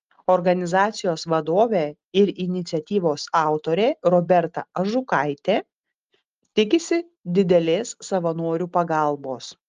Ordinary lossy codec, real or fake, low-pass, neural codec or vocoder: Opus, 32 kbps; real; 7.2 kHz; none